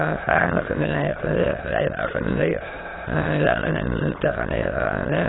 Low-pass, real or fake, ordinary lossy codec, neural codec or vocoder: 7.2 kHz; fake; AAC, 16 kbps; autoencoder, 22.05 kHz, a latent of 192 numbers a frame, VITS, trained on many speakers